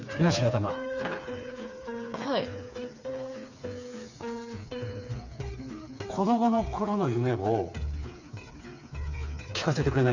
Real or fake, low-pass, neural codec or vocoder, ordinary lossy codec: fake; 7.2 kHz; codec, 16 kHz, 4 kbps, FreqCodec, smaller model; none